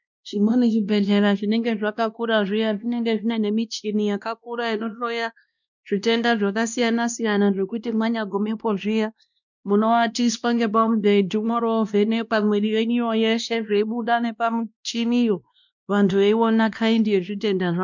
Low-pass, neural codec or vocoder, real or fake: 7.2 kHz; codec, 16 kHz, 1 kbps, X-Codec, WavLM features, trained on Multilingual LibriSpeech; fake